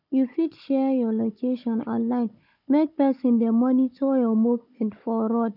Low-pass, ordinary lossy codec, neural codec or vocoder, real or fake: 5.4 kHz; none; codec, 16 kHz, 16 kbps, FunCodec, trained on Chinese and English, 50 frames a second; fake